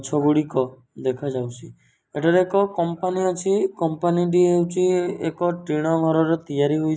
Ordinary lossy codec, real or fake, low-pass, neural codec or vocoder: none; real; none; none